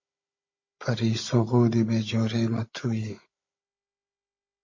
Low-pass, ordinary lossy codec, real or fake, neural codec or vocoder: 7.2 kHz; MP3, 32 kbps; fake; codec, 16 kHz, 16 kbps, FunCodec, trained on Chinese and English, 50 frames a second